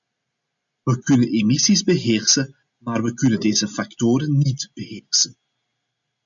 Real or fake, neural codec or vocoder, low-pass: real; none; 7.2 kHz